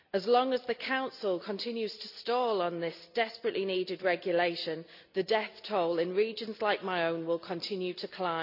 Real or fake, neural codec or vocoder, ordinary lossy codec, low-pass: real; none; none; 5.4 kHz